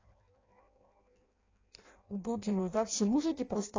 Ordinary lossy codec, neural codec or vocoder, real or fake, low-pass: AAC, 32 kbps; codec, 16 kHz in and 24 kHz out, 0.6 kbps, FireRedTTS-2 codec; fake; 7.2 kHz